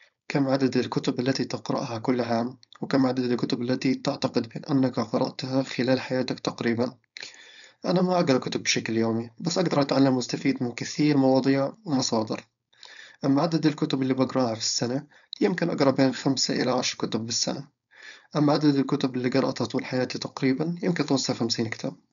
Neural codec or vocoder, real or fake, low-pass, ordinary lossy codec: codec, 16 kHz, 4.8 kbps, FACodec; fake; 7.2 kHz; none